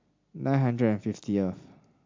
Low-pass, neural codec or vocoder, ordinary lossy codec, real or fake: 7.2 kHz; none; MP3, 48 kbps; real